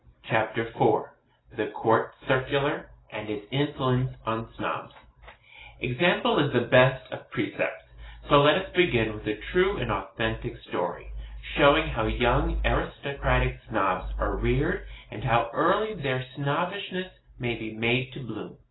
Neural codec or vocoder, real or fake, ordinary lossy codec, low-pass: none; real; AAC, 16 kbps; 7.2 kHz